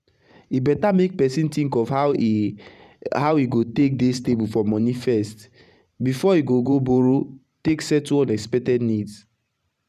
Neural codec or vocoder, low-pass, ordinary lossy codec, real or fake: vocoder, 44.1 kHz, 128 mel bands every 512 samples, BigVGAN v2; 14.4 kHz; none; fake